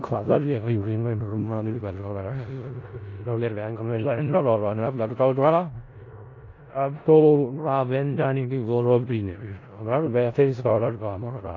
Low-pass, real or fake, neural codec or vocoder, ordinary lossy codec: 7.2 kHz; fake; codec, 16 kHz in and 24 kHz out, 0.4 kbps, LongCat-Audio-Codec, four codebook decoder; AAC, 32 kbps